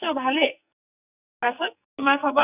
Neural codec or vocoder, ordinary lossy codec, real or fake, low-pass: codec, 44.1 kHz, 2.6 kbps, SNAC; none; fake; 3.6 kHz